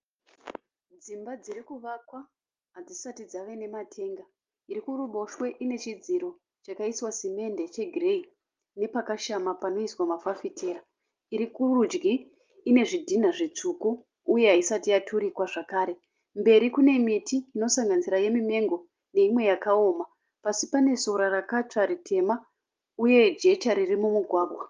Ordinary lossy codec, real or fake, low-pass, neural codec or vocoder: Opus, 32 kbps; real; 7.2 kHz; none